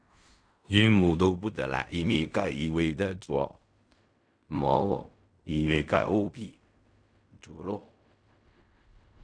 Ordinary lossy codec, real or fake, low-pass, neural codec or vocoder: none; fake; 9.9 kHz; codec, 16 kHz in and 24 kHz out, 0.4 kbps, LongCat-Audio-Codec, fine tuned four codebook decoder